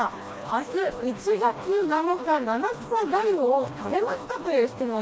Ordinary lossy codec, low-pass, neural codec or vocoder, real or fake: none; none; codec, 16 kHz, 1 kbps, FreqCodec, smaller model; fake